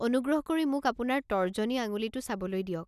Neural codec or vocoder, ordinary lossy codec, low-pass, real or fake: none; none; 14.4 kHz; real